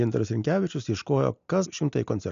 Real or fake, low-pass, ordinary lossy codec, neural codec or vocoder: real; 7.2 kHz; MP3, 64 kbps; none